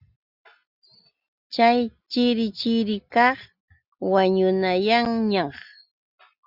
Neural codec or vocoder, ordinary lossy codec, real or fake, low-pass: none; Opus, 64 kbps; real; 5.4 kHz